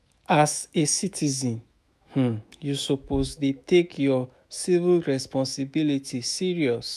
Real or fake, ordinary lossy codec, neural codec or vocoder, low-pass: fake; none; autoencoder, 48 kHz, 128 numbers a frame, DAC-VAE, trained on Japanese speech; 14.4 kHz